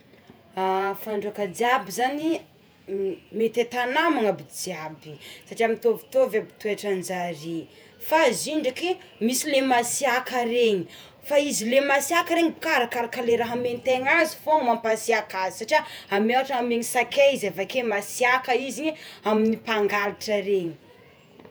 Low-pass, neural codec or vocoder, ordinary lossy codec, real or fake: none; vocoder, 48 kHz, 128 mel bands, Vocos; none; fake